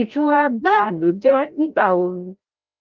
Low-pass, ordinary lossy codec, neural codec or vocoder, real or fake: 7.2 kHz; Opus, 32 kbps; codec, 16 kHz, 0.5 kbps, FreqCodec, larger model; fake